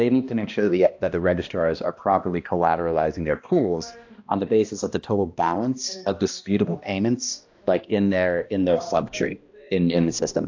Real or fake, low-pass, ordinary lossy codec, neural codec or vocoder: fake; 7.2 kHz; AAC, 48 kbps; codec, 16 kHz, 1 kbps, X-Codec, HuBERT features, trained on balanced general audio